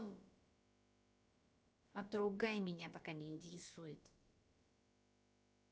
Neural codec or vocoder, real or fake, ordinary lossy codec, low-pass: codec, 16 kHz, about 1 kbps, DyCAST, with the encoder's durations; fake; none; none